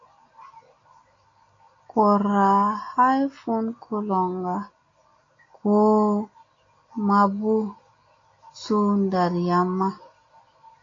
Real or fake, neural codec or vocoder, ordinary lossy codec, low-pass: real; none; AAC, 32 kbps; 7.2 kHz